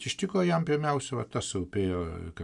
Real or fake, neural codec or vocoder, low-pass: real; none; 10.8 kHz